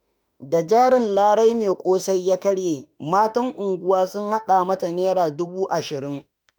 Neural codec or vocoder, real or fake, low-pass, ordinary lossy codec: autoencoder, 48 kHz, 32 numbers a frame, DAC-VAE, trained on Japanese speech; fake; none; none